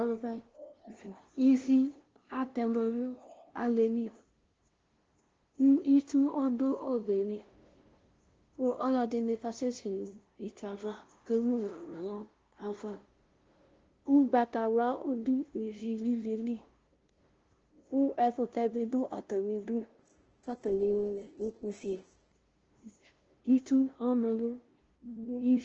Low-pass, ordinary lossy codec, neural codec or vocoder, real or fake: 7.2 kHz; Opus, 16 kbps; codec, 16 kHz, 0.5 kbps, FunCodec, trained on LibriTTS, 25 frames a second; fake